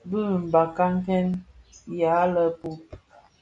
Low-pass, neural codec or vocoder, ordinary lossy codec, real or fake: 9.9 kHz; none; MP3, 96 kbps; real